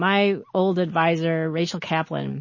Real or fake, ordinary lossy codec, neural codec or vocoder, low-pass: real; MP3, 32 kbps; none; 7.2 kHz